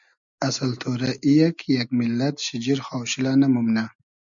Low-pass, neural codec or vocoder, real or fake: 7.2 kHz; none; real